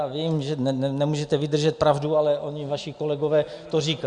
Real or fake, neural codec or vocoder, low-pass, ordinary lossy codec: real; none; 9.9 kHz; Opus, 64 kbps